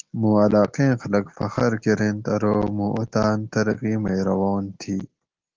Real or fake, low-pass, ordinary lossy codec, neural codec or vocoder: real; 7.2 kHz; Opus, 16 kbps; none